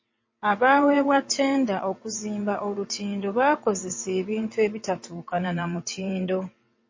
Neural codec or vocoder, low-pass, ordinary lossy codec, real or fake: vocoder, 22.05 kHz, 80 mel bands, WaveNeXt; 7.2 kHz; MP3, 32 kbps; fake